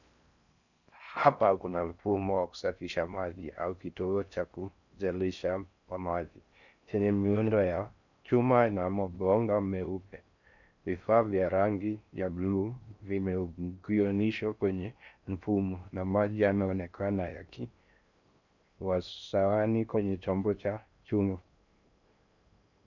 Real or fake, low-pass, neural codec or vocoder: fake; 7.2 kHz; codec, 16 kHz in and 24 kHz out, 0.6 kbps, FocalCodec, streaming, 4096 codes